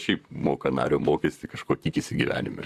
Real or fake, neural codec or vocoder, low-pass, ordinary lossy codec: fake; vocoder, 44.1 kHz, 128 mel bands, Pupu-Vocoder; 14.4 kHz; Opus, 64 kbps